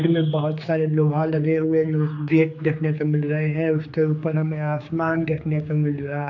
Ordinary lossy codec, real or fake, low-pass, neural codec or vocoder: AAC, 48 kbps; fake; 7.2 kHz; codec, 16 kHz, 4 kbps, X-Codec, HuBERT features, trained on general audio